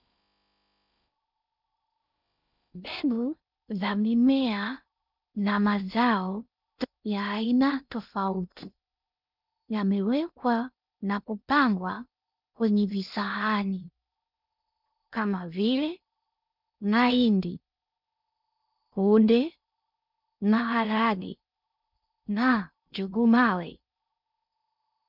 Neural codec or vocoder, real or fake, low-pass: codec, 16 kHz in and 24 kHz out, 0.6 kbps, FocalCodec, streaming, 4096 codes; fake; 5.4 kHz